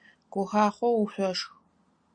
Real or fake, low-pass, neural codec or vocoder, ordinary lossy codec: real; 9.9 kHz; none; Opus, 64 kbps